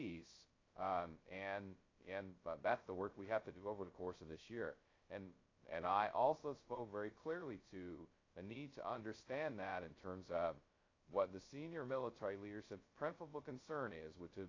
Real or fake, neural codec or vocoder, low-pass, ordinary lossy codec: fake; codec, 16 kHz, 0.2 kbps, FocalCodec; 7.2 kHz; AAC, 32 kbps